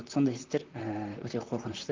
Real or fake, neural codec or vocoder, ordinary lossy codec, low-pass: fake; vocoder, 44.1 kHz, 128 mel bands, Pupu-Vocoder; Opus, 32 kbps; 7.2 kHz